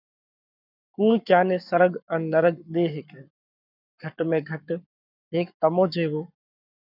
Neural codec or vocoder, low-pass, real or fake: codec, 44.1 kHz, 7.8 kbps, Pupu-Codec; 5.4 kHz; fake